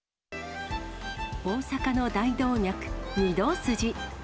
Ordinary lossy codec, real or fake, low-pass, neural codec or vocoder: none; real; none; none